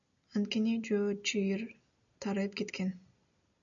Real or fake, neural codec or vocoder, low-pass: real; none; 7.2 kHz